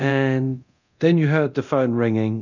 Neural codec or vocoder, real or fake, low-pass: codec, 24 kHz, 0.9 kbps, DualCodec; fake; 7.2 kHz